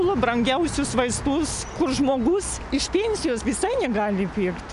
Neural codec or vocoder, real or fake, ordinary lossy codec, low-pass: none; real; MP3, 96 kbps; 10.8 kHz